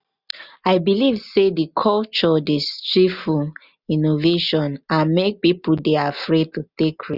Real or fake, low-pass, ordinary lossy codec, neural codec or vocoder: real; 5.4 kHz; Opus, 64 kbps; none